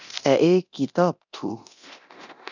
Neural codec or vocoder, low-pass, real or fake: codec, 24 kHz, 0.9 kbps, DualCodec; 7.2 kHz; fake